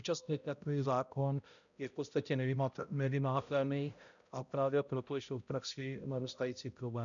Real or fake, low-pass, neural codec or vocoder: fake; 7.2 kHz; codec, 16 kHz, 0.5 kbps, X-Codec, HuBERT features, trained on balanced general audio